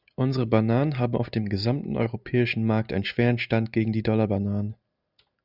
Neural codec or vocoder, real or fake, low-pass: none; real; 5.4 kHz